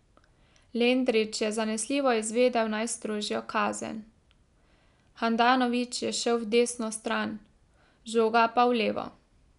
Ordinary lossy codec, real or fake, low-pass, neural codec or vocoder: none; real; 10.8 kHz; none